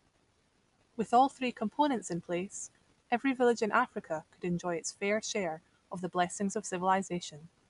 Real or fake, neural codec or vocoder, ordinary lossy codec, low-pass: real; none; none; 10.8 kHz